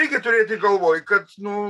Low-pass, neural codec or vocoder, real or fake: 14.4 kHz; none; real